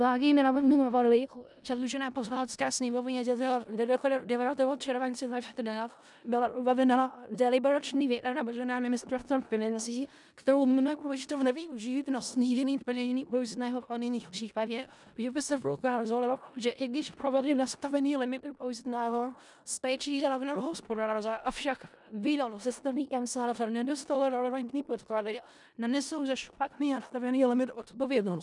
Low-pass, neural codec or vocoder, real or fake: 10.8 kHz; codec, 16 kHz in and 24 kHz out, 0.4 kbps, LongCat-Audio-Codec, four codebook decoder; fake